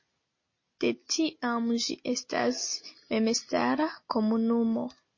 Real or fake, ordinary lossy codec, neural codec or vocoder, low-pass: real; MP3, 32 kbps; none; 7.2 kHz